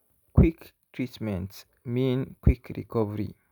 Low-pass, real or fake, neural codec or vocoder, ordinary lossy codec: 19.8 kHz; real; none; none